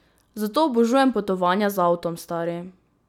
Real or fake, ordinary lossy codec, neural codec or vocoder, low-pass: real; none; none; 19.8 kHz